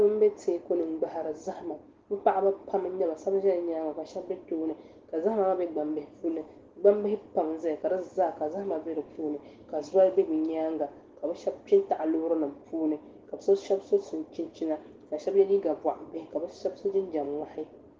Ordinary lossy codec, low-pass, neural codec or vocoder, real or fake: Opus, 16 kbps; 7.2 kHz; none; real